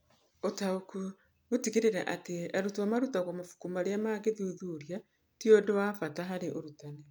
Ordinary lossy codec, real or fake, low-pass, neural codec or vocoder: none; real; none; none